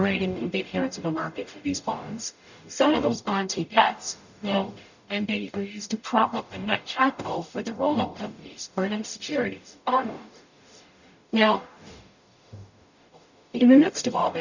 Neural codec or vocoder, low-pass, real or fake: codec, 44.1 kHz, 0.9 kbps, DAC; 7.2 kHz; fake